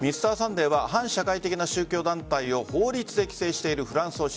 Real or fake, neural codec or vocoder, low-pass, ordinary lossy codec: real; none; none; none